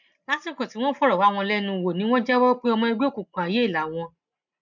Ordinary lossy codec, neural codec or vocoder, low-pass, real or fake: none; none; 7.2 kHz; real